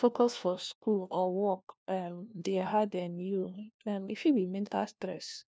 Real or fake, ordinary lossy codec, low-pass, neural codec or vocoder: fake; none; none; codec, 16 kHz, 1 kbps, FunCodec, trained on LibriTTS, 50 frames a second